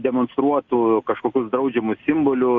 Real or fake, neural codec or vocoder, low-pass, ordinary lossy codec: real; none; 7.2 kHz; Opus, 64 kbps